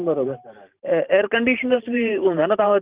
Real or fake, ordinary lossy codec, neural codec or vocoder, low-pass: fake; Opus, 32 kbps; vocoder, 44.1 kHz, 128 mel bands every 512 samples, BigVGAN v2; 3.6 kHz